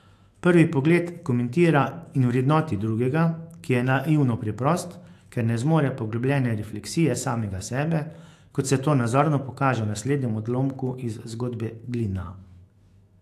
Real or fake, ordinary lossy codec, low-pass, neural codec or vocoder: fake; AAC, 64 kbps; 14.4 kHz; autoencoder, 48 kHz, 128 numbers a frame, DAC-VAE, trained on Japanese speech